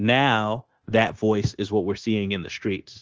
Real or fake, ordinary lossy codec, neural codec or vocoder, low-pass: fake; Opus, 16 kbps; codec, 16 kHz, 0.9 kbps, LongCat-Audio-Codec; 7.2 kHz